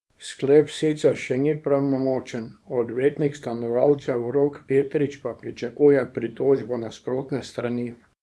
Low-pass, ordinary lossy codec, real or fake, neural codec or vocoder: none; none; fake; codec, 24 kHz, 0.9 kbps, WavTokenizer, small release